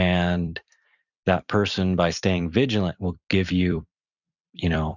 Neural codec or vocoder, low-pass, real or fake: vocoder, 44.1 kHz, 128 mel bands every 256 samples, BigVGAN v2; 7.2 kHz; fake